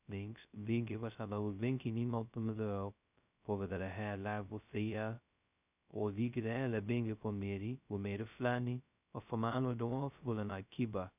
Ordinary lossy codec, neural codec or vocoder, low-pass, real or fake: none; codec, 16 kHz, 0.2 kbps, FocalCodec; 3.6 kHz; fake